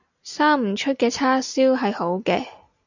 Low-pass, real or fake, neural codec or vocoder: 7.2 kHz; real; none